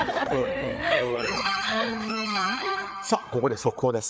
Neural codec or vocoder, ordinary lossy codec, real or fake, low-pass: codec, 16 kHz, 4 kbps, FreqCodec, larger model; none; fake; none